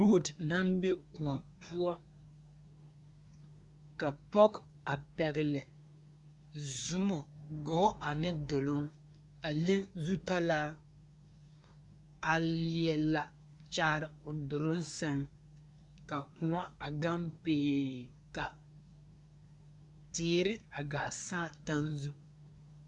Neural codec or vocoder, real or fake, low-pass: codec, 24 kHz, 1 kbps, SNAC; fake; 10.8 kHz